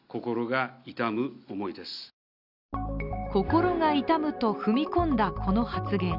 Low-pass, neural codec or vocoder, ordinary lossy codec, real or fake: 5.4 kHz; none; none; real